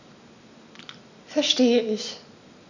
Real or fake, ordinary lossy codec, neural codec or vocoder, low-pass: real; none; none; 7.2 kHz